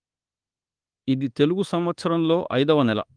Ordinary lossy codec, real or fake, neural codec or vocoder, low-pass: Opus, 24 kbps; fake; codec, 24 kHz, 1.2 kbps, DualCodec; 9.9 kHz